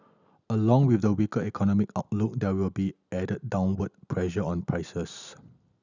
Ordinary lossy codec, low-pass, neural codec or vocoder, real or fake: none; 7.2 kHz; none; real